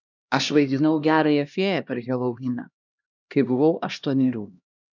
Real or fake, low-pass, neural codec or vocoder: fake; 7.2 kHz; codec, 16 kHz, 1 kbps, X-Codec, HuBERT features, trained on LibriSpeech